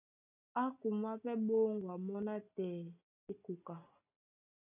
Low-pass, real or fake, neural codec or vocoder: 3.6 kHz; real; none